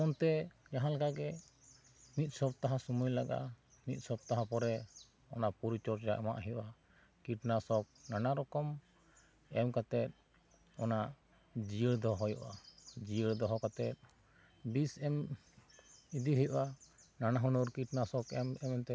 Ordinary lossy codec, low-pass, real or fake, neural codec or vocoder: none; none; real; none